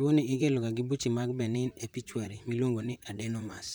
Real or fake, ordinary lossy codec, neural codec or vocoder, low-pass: fake; none; vocoder, 44.1 kHz, 128 mel bands, Pupu-Vocoder; none